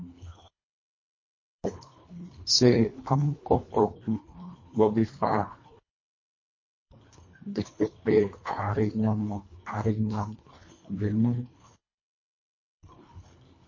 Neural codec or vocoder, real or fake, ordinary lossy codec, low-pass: codec, 24 kHz, 1.5 kbps, HILCodec; fake; MP3, 32 kbps; 7.2 kHz